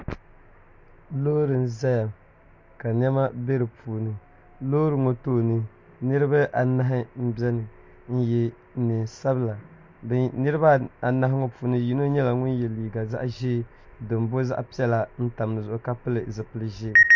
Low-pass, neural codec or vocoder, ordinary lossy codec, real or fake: 7.2 kHz; none; MP3, 64 kbps; real